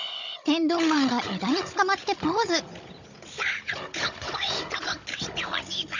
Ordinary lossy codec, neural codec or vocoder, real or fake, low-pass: none; codec, 16 kHz, 16 kbps, FunCodec, trained on Chinese and English, 50 frames a second; fake; 7.2 kHz